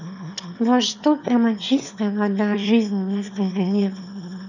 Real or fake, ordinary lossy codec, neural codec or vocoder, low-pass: fake; none; autoencoder, 22.05 kHz, a latent of 192 numbers a frame, VITS, trained on one speaker; 7.2 kHz